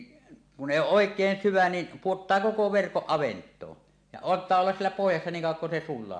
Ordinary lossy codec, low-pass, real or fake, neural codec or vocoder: AAC, 64 kbps; 9.9 kHz; real; none